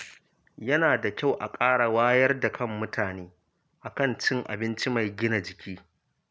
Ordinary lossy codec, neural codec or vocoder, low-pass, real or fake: none; none; none; real